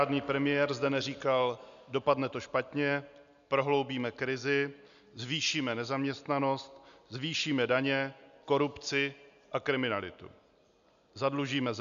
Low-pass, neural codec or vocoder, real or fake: 7.2 kHz; none; real